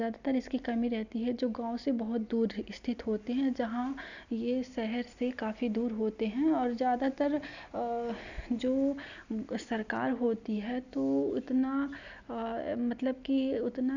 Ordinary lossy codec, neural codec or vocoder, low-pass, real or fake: none; none; 7.2 kHz; real